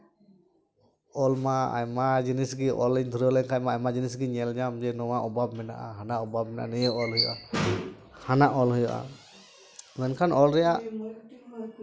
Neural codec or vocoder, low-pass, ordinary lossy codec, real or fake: none; none; none; real